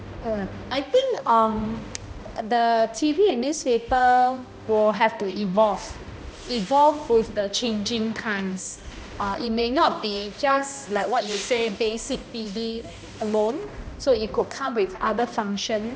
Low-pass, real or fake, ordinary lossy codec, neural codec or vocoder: none; fake; none; codec, 16 kHz, 1 kbps, X-Codec, HuBERT features, trained on balanced general audio